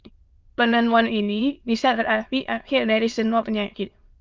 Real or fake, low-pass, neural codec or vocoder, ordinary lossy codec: fake; 7.2 kHz; autoencoder, 22.05 kHz, a latent of 192 numbers a frame, VITS, trained on many speakers; Opus, 24 kbps